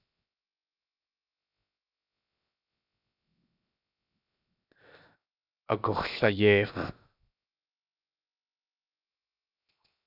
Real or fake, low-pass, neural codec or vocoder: fake; 5.4 kHz; codec, 16 kHz, 0.7 kbps, FocalCodec